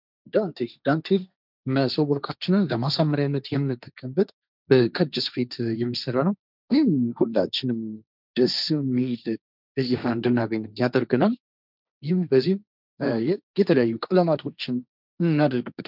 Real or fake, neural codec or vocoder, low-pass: fake; codec, 16 kHz, 1.1 kbps, Voila-Tokenizer; 5.4 kHz